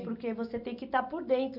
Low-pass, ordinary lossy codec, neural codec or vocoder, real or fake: 5.4 kHz; none; none; real